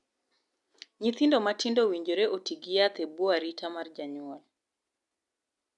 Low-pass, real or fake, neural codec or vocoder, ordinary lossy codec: 10.8 kHz; real; none; none